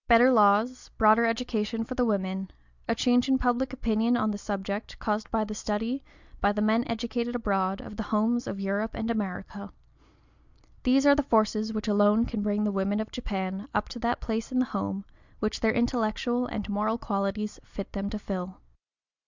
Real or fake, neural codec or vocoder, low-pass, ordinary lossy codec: real; none; 7.2 kHz; Opus, 64 kbps